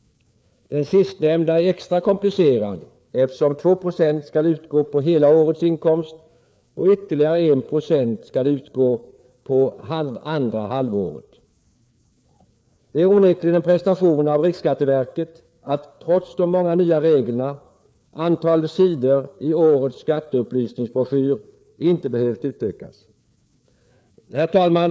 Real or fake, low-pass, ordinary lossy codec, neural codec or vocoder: fake; none; none; codec, 16 kHz, 4 kbps, FreqCodec, larger model